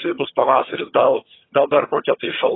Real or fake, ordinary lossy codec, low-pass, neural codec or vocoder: fake; AAC, 16 kbps; 7.2 kHz; vocoder, 22.05 kHz, 80 mel bands, HiFi-GAN